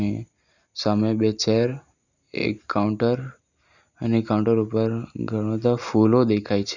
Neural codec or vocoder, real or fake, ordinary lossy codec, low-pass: none; real; none; 7.2 kHz